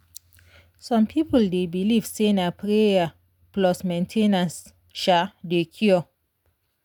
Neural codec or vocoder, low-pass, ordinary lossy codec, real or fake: none; 19.8 kHz; none; real